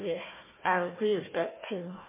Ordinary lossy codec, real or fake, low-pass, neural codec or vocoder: MP3, 16 kbps; fake; 3.6 kHz; codec, 16 kHz, 1 kbps, FunCodec, trained on Chinese and English, 50 frames a second